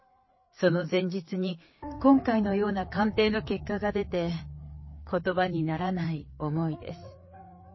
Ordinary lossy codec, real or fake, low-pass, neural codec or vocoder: MP3, 24 kbps; fake; 7.2 kHz; codec, 16 kHz, 4 kbps, FreqCodec, larger model